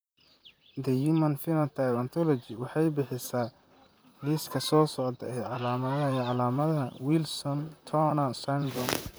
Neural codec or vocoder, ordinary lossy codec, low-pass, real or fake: vocoder, 44.1 kHz, 128 mel bands, Pupu-Vocoder; none; none; fake